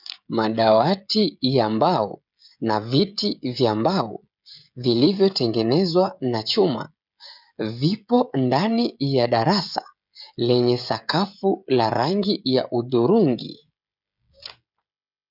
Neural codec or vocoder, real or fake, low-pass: codec, 16 kHz, 16 kbps, FreqCodec, smaller model; fake; 5.4 kHz